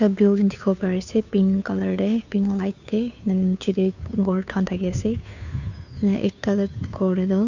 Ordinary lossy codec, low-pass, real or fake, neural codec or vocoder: none; 7.2 kHz; fake; codec, 16 kHz, 2 kbps, FunCodec, trained on Chinese and English, 25 frames a second